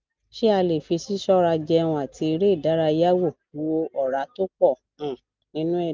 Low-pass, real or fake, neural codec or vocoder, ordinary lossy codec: 7.2 kHz; real; none; Opus, 24 kbps